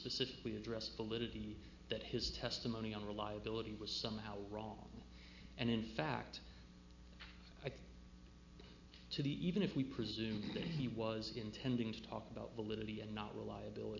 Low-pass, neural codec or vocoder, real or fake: 7.2 kHz; none; real